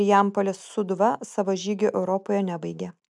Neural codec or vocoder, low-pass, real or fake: none; 10.8 kHz; real